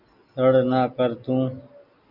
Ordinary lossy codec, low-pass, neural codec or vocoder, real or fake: Opus, 64 kbps; 5.4 kHz; none; real